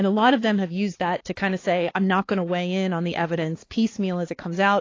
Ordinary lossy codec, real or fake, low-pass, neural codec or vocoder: AAC, 32 kbps; fake; 7.2 kHz; codec, 16 kHz, 4 kbps, X-Codec, HuBERT features, trained on balanced general audio